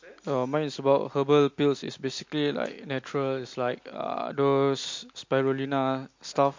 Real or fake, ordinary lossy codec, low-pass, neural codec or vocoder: real; MP3, 48 kbps; 7.2 kHz; none